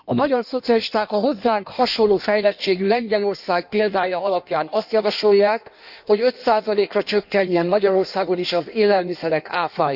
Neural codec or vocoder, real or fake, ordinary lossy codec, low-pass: codec, 16 kHz in and 24 kHz out, 1.1 kbps, FireRedTTS-2 codec; fake; none; 5.4 kHz